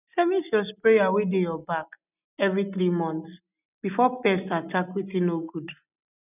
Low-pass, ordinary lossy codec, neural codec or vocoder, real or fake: 3.6 kHz; none; none; real